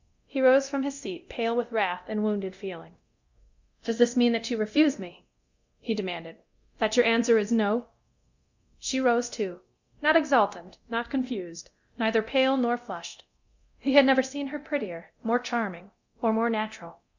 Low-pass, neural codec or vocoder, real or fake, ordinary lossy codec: 7.2 kHz; codec, 24 kHz, 0.9 kbps, DualCodec; fake; Opus, 64 kbps